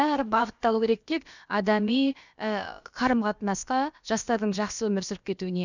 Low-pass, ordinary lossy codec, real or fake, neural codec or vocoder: 7.2 kHz; none; fake; codec, 16 kHz, about 1 kbps, DyCAST, with the encoder's durations